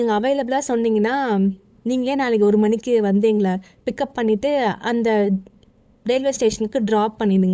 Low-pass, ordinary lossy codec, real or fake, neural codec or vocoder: none; none; fake; codec, 16 kHz, 8 kbps, FunCodec, trained on LibriTTS, 25 frames a second